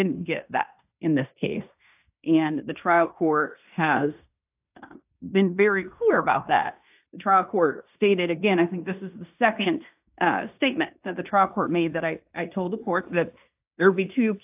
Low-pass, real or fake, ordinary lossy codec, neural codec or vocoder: 3.6 kHz; fake; AAC, 32 kbps; codec, 16 kHz in and 24 kHz out, 0.9 kbps, LongCat-Audio-Codec, fine tuned four codebook decoder